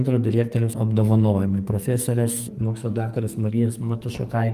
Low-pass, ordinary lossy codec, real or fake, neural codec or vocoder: 14.4 kHz; Opus, 32 kbps; fake; codec, 44.1 kHz, 2.6 kbps, SNAC